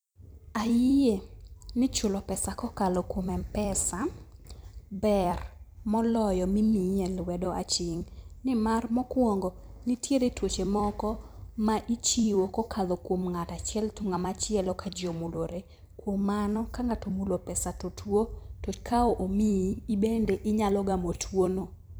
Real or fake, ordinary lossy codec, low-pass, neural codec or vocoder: fake; none; none; vocoder, 44.1 kHz, 128 mel bands every 256 samples, BigVGAN v2